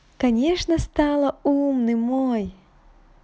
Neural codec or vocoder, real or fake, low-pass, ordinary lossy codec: none; real; none; none